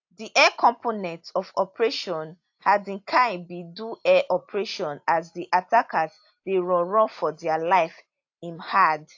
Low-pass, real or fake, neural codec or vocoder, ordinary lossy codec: 7.2 kHz; real; none; AAC, 48 kbps